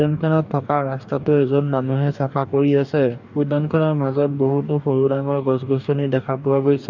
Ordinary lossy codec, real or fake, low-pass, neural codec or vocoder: none; fake; 7.2 kHz; codec, 44.1 kHz, 2.6 kbps, DAC